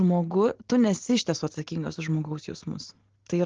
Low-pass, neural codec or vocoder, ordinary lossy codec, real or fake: 7.2 kHz; none; Opus, 16 kbps; real